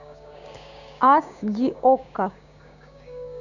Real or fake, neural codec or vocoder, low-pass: fake; codec, 16 kHz, 6 kbps, DAC; 7.2 kHz